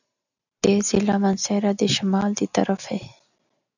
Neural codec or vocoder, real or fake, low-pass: none; real; 7.2 kHz